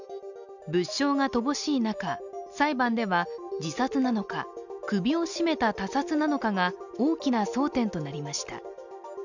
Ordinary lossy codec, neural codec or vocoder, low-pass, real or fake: none; none; 7.2 kHz; real